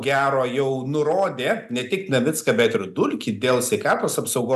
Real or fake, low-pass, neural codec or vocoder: real; 14.4 kHz; none